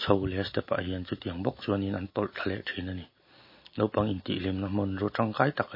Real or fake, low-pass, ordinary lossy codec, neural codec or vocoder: real; 5.4 kHz; MP3, 24 kbps; none